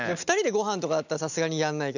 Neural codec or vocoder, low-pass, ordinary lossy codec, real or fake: none; 7.2 kHz; none; real